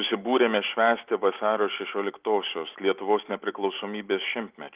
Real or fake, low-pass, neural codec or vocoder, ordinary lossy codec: real; 3.6 kHz; none; Opus, 24 kbps